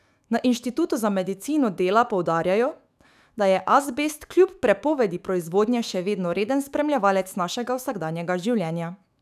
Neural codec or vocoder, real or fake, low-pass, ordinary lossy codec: autoencoder, 48 kHz, 128 numbers a frame, DAC-VAE, trained on Japanese speech; fake; 14.4 kHz; none